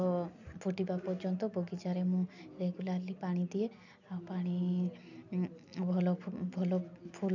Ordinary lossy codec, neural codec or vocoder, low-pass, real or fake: none; none; 7.2 kHz; real